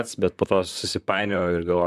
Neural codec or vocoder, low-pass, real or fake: vocoder, 44.1 kHz, 128 mel bands, Pupu-Vocoder; 14.4 kHz; fake